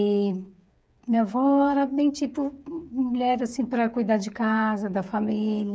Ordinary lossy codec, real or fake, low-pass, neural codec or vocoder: none; fake; none; codec, 16 kHz, 8 kbps, FreqCodec, smaller model